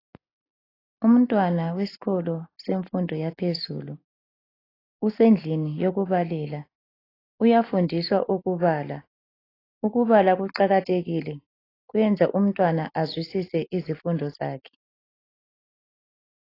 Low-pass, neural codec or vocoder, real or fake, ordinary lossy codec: 5.4 kHz; none; real; AAC, 24 kbps